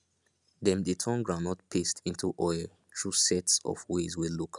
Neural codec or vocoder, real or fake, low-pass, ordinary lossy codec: none; real; 10.8 kHz; none